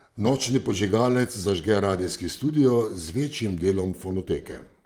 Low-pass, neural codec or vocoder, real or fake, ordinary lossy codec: 19.8 kHz; vocoder, 44.1 kHz, 128 mel bands, Pupu-Vocoder; fake; Opus, 32 kbps